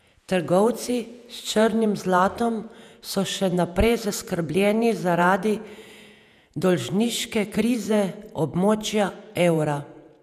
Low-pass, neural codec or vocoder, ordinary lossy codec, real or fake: 14.4 kHz; vocoder, 48 kHz, 128 mel bands, Vocos; none; fake